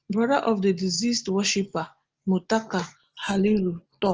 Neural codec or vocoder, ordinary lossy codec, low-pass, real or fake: none; Opus, 16 kbps; 7.2 kHz; real